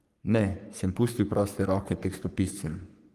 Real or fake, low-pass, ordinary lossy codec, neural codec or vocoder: fake; 14.4 kHz; Opus, 32 kbps; codec, 44.1 kHz, 3.4 kbps, Pupu-Codec